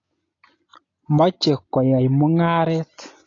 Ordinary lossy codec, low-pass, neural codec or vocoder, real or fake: AAC, 32 kbps; 7.2 kHz; none; real